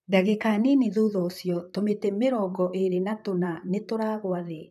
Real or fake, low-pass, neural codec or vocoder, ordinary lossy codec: fake; 14.4 kHz; vocoder, 44.1 kHz, 128 mel bands, Pupu-Vocoder; none